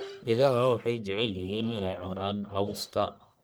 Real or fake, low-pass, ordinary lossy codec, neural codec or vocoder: fake; none; none; codec, 44.1 kHz, 1.7 kbps, Pupu-Codec